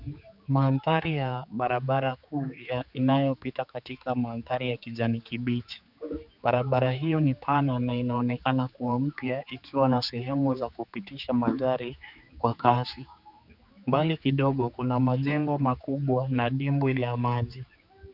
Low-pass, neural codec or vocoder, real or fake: 5.4 kHz; codec, 16 kHz, 4 kbps, X-Codec, HuBERT features, trained on general audio; fake